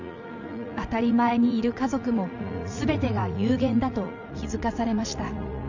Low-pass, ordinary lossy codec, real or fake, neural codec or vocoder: 7.2 kHz; none; fake; vocoder, 44.1 kHz, 80 mel bands, Vocos